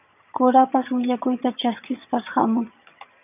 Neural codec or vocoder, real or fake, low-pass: vocoder, 22.05 kHz, 80 mel bands, HiFi-GAN; fake; 3.6 kHz